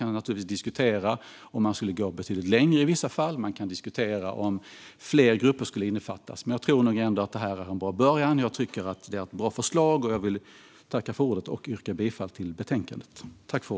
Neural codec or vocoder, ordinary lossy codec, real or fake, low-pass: none; none; real; none